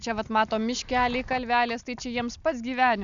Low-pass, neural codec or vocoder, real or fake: 7.2 kHz; none; real